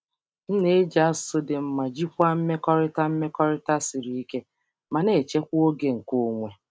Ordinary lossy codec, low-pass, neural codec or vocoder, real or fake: none; none; none; real